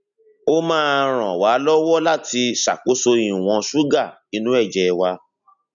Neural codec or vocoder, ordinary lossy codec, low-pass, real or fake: none; none; 7.2 kHz; real